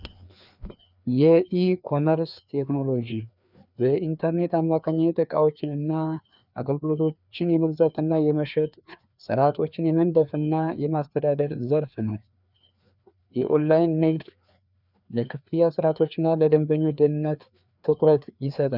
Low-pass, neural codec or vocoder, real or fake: 5.4 kHz; codec, 16 kHz, 2 kbps, FreqCodec, larger model; fake